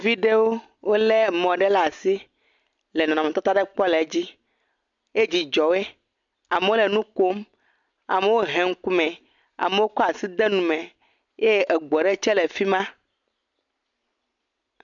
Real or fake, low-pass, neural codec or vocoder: real; 7.2 kHz; none